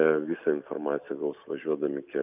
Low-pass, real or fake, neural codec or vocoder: 3.6 kHz; real; none